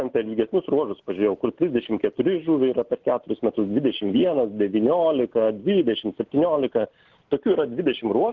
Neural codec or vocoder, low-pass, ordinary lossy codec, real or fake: none; 7.2 kHz; Opus, 16 kbps; real